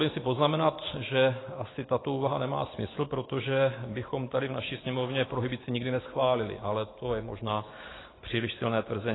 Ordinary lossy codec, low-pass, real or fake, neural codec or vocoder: AAC, 16 kbps; 7.2 kHz; real; none